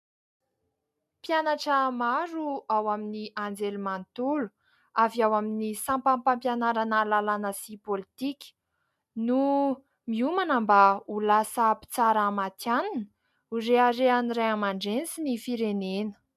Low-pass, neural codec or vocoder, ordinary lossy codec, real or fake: 14.4 kHz; none; MP3, 96 kbps; real